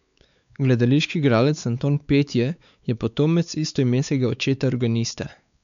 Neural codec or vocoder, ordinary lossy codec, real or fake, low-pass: codec, 16 kHz, 4 kbps, X-Codec, WavLM features, trained on Multilingual LibriSpeech; none; fake; 7.2 kHz